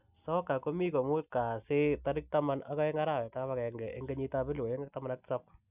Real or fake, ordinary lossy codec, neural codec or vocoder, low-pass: real; none; none; 3.6 kHz